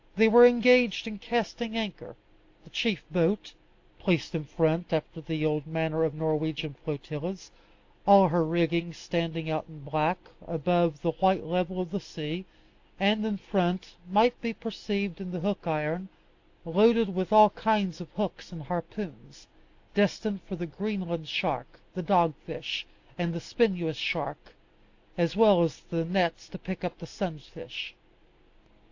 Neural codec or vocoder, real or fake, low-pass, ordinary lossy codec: none; real; 7.2 kHz; AAC, 48 kbps